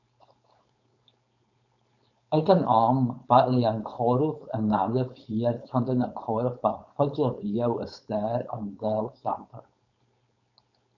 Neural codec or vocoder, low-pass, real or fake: codec, 16 kHz, 4.8 kbps, FACodec; 7.2 kHz; fake